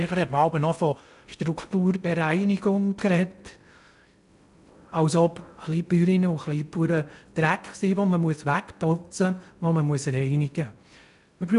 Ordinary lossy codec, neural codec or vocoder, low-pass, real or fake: none; codec, 16 kHz in and 24 kHz out, 0.6 kbps, FocalCodec, streaming, 2048 codes; 10.8 kHz; fake